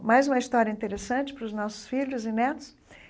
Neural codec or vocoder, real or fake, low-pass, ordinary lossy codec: none; real; none; none